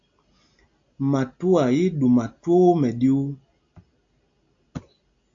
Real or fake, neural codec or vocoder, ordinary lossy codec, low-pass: real; none; AAC, 64 kbps; 7.2 kHz